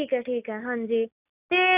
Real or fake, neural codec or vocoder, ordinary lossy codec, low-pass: real; none; none; 3.6 kHz